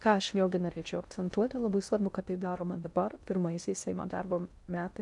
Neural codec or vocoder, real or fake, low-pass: codec, 16 kHz in and 24 kHz out, 0.6 kbps, FocalCodec, streaming, 2048 codes; fake; 10.8 kHz